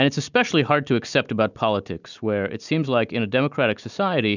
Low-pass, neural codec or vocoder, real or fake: 7.2 kHz; none; real